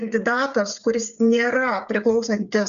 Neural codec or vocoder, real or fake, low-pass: codec, 16 kHz, 8 kbps, FreqCodec, smaller model; fake; 7.2 kHz